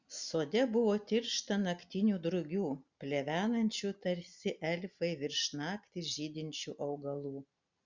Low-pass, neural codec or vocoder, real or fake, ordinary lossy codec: 7.2 kHz; none; real; Opus, 64 kbps